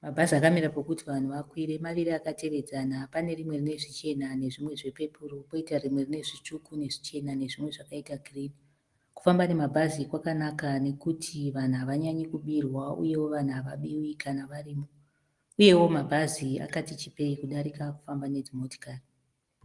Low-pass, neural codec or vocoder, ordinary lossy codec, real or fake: 10.8 kHz; none; Opus, 24 kbps; real